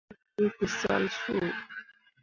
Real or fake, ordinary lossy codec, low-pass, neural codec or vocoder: real; MP3, 64 kbps; 7.2 kHz; none